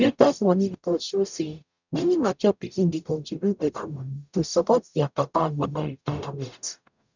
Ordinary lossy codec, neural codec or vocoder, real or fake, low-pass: none; codec, 44.1 kHz, 0.9 kbps, DAC; fake; 7.2 kHz